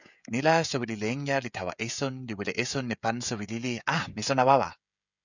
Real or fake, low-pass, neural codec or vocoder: fake; 7.2 kHz; codec, 16 kHz, 16 kbps, FreqCodec, smaller model